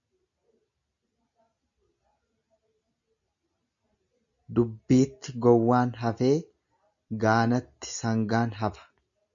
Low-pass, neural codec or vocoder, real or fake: 7.2 kHz; none; real